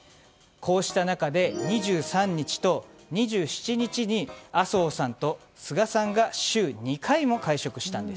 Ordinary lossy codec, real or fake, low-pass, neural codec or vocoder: none; real; none; none